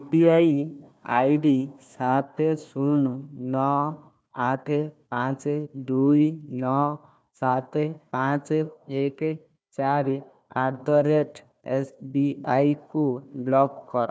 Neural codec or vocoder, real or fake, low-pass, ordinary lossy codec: codec, 16 kHz, 1 kbps, FunCodec, trained on Chinese and English, 50 frames a second; fake; none; none